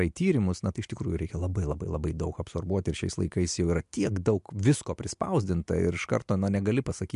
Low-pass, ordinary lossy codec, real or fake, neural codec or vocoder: 10.8 kHz; MP3, 64 kbps; real; none